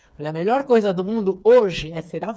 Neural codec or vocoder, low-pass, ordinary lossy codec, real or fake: codec, 16 kHz, 4 kbps, FreqCodec, smaller model; none; none; fake